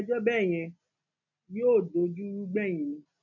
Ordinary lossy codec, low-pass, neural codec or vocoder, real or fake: none; 7.2 kHz; none; real